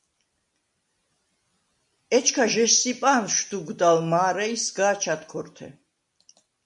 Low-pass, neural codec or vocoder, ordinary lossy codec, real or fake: 10.8 kHz; none; MP3, 48 kbps; real